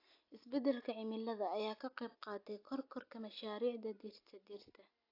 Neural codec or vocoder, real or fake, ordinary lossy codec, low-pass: none; real; AAC, 32 kbps; 5.4 kHz